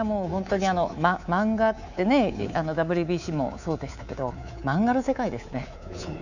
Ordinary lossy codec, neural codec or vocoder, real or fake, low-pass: none; codec, 24 kHz, 3.1 kbps, DualCodec; fake; 7.2 kHz